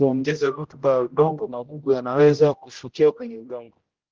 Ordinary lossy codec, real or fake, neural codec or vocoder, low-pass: Opus, 16 kbps; fake; codec, 16 kHz, 0.5 kbps, X-Codec, HuBERT features, trained on general audio; 7.2 kHz